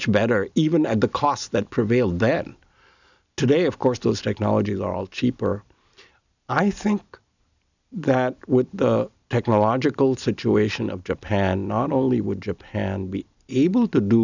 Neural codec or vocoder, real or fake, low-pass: none; real; 7.2 kHz